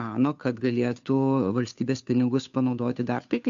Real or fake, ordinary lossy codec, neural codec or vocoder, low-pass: fake; AAC, 64 kbps; codec, 16 kHz, 2 kbps, FunCodec, trained on Chinese and English, 25 frames a second; 7.2 kHz